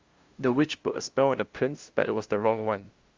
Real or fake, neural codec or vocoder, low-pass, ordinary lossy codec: fake; codec, 16 kHz, 0.5 kbps, FunCodec, trained on LibriTTS, 25 frames a second; 7.2 kHz; Opus, 32 kbps